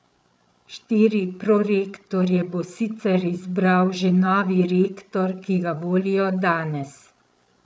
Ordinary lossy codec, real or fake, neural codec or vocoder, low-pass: none; fake; codec, 16 kHz, 8 kbps, FreqCodec, larger model; none